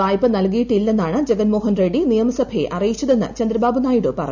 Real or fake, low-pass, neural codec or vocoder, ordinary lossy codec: real; 7.2 kHz; none; none